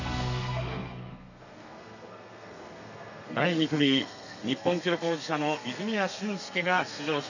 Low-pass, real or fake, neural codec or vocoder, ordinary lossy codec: 7.2 kHz; fake; codec, 32 kHz, 1.9 kbps, SNAC; none